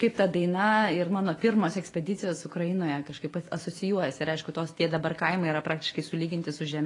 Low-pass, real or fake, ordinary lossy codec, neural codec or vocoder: 10.8 kHz; fake; AAC, 32 kbps; autoencoder, 48 kHz, 128 numbers a frame, DAC-VAE, trained on Japanese speech